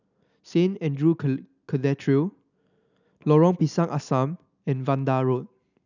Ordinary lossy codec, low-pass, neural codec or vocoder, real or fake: none; 7.2 kHz; none; real